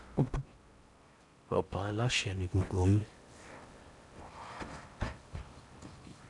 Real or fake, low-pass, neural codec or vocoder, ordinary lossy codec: fake; 10.8 kHz; codec, 16 kHz in and 24 kHz out, 0.6 kbps, FocalCodec, streaming, 4096 codes; AAC, 64 kbps